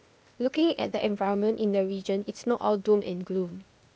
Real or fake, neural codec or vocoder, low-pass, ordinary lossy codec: fake; codec, 16 kHz, 0.8 kbps, ZipCodec; none; none